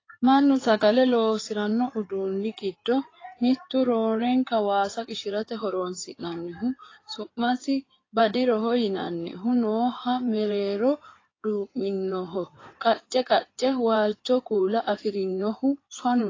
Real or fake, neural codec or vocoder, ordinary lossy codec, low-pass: fake; codec, 16 kHz in and 24 kHz out, 2.2 kbps, FireRedTTS-2 codec; AAC, 32 kbps; 7.2 kHz